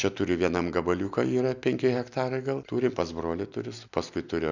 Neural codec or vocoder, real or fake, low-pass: none; real; 7.2 kHz